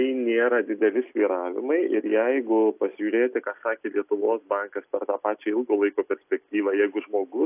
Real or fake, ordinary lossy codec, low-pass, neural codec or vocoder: real; AAC, 32 kbps; 3.6 kHz; none